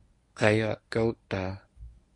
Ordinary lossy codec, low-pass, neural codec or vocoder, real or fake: AAC, 64 kbps; 10.8 kHz; codec, 24 kHz, 0.9 kbps, WavTokenizer, medium speech release version 1; fake